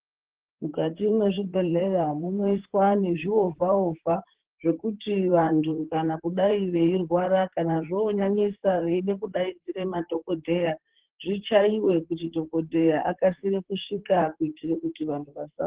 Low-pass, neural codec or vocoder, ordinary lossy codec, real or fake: 3.6 kHz; vocoder, 44.1 kHz, 128 mel bands, Pupu-Vocoder; Opus, 16 kbps; fake